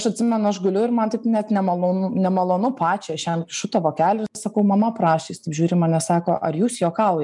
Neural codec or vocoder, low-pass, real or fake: none; 10.8 kHz; real